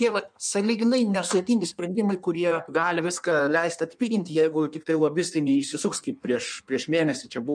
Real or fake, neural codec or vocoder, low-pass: fake; codec, 16 kHz in and 24 kHz out, 1.1 kbps, FireRedTTS-2 codec; 9.9 kHz